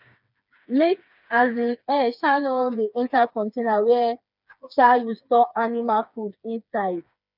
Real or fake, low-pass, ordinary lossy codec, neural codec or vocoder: fake; 5.4 kHz; none; codec, 16 kHz, 4 kbps, FreqCodec, smaller model